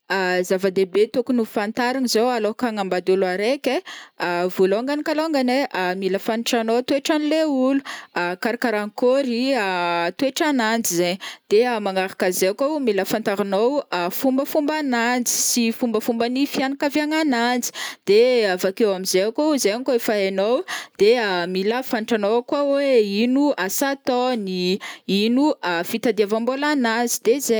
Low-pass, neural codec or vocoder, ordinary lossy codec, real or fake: none; none; none; real